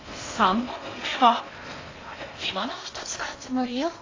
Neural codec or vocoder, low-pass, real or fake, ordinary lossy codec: codec, 16 kHz in and 24 kHz out, 0.6 kbps, FocalCodec, streaming, 4096 codes; 7.2 kHz; fake; AAC, 32 kbps